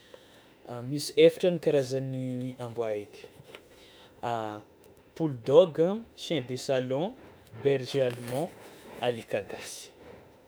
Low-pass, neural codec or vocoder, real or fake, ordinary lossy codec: none; autoencoder, 48 kHz, 32 numbers a frame, DAC-VAE, trained on Japanese speech; fake; none